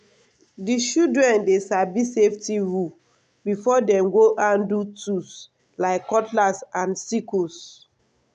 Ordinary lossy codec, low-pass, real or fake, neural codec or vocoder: none; 9.9 kHz; real; none